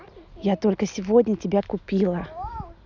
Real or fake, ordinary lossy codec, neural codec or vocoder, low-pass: real; none; none; 7.2 kHz